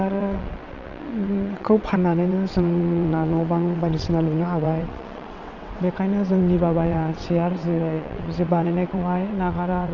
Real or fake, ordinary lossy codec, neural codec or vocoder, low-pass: fake; none; vocoder, 22.05 kHz, 80 mel bands, WaveNeXt; 7.2 kHz